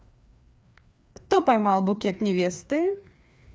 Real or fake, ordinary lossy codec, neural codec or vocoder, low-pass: fake; none; codec, 16 kHz, 2 kbps, FreqCodec, larger model; none